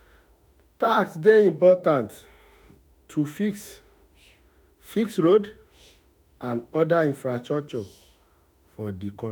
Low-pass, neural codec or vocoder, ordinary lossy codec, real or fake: none; autoencoder, 48 kHz, 32 numbers a frame, DAC-VAE, trained on Japanese speech; none; fake